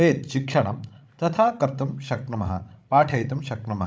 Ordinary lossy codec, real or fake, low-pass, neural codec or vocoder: none; fake; none; codec, 16 kHz, 16 kbps, FreqCodec, larger model